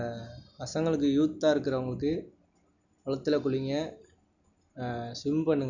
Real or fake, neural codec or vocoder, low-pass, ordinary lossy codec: real; none; 7.2 kHz; none